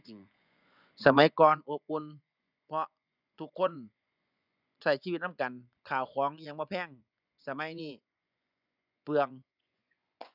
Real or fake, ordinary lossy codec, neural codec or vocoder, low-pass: fake; none; vocoder, 24 kHz, 100 mel bands, Vocos; 5.4 kHz